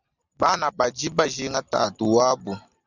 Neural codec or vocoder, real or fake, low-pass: none; real; 7.2 kHz